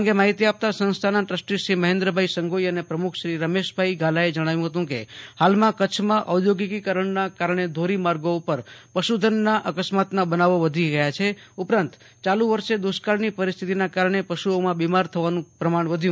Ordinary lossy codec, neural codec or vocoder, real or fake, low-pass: none; none; real; none